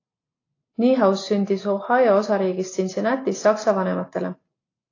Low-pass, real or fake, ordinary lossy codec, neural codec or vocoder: 7.2 kHz; real; AAC, 32 kbps; none